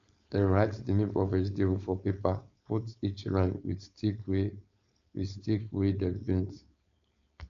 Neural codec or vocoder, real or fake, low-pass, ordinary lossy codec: codec, 16 kHz, 4.8 kbps, FACodec; fake; 7.2 kHz; AAC, 64 kbps